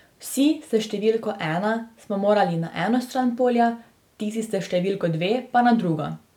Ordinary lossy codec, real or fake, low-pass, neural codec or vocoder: none; real; 19.8 kHz; none